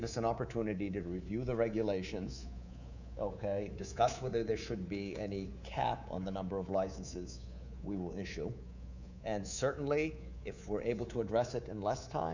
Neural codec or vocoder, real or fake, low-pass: codec, 24 kHz, 3.1 kbps, DualCodec; fake; 7.2 kHz